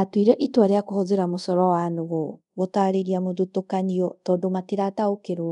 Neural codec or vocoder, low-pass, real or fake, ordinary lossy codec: codec, 24 kHz, 0.5 kbps, DualCodec; 10.8 kHz; fake; none